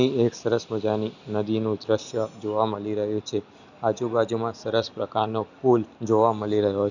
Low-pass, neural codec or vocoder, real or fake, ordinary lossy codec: 7.2 kHz; none; real; none